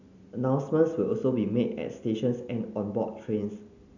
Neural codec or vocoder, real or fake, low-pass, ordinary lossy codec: none; real; 7.2 kHz; none